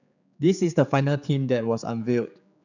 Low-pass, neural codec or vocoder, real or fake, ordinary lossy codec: 7.2 kHz; codec, 16 kHz, 4 kbps, X-Codec, HuBERT features, trained on general audio; fake; none